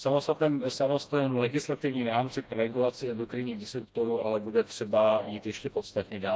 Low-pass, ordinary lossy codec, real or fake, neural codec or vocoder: none; none; fake; codec, 16 kHz, 1 kbps, FreqCodec, smaller model